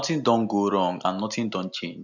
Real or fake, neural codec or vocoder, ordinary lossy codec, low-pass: real; none; none; 7.2 kHz